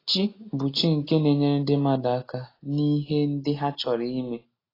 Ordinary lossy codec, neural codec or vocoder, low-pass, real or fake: AAC, 24 kbps; none; 5.4 kHz; real